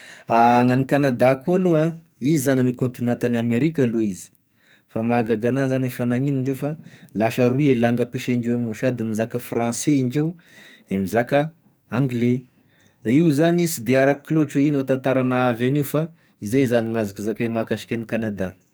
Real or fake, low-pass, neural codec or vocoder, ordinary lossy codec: fake; none; codec, 44.1 kHz, 2.6 kbps, SNAC; none